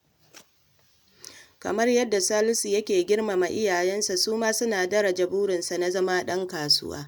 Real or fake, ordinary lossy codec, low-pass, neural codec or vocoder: real; none; none; none